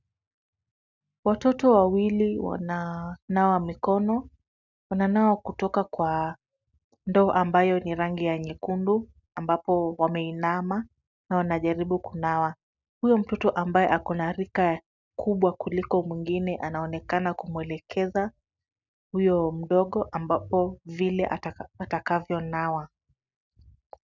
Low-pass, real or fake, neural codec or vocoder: 7.2 kHz; real; none